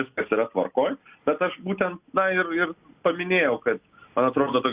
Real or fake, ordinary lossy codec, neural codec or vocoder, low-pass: real; Opus, 64 kbps; none; 3.6 kHz